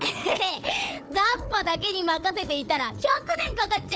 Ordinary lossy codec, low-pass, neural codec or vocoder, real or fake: none; none; codec, 16 kHz, 4 kbps, FunCodec, trained on Chinese and English, 50 frames a second; fake